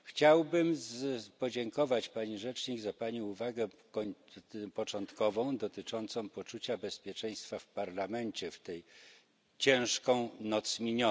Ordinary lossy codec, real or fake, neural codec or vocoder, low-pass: none; real; none; none